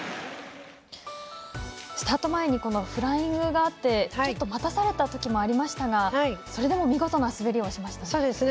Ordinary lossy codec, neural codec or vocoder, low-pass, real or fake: none; none; none; real